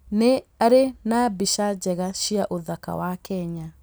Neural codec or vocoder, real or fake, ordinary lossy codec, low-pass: none; real; none; none